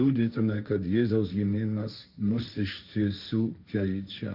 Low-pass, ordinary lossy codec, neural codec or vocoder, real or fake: 5.4 kHz; Opus, 64 kbps; codec, 16 kHz, 1.1 kbps, Voila-Tokenizer; fake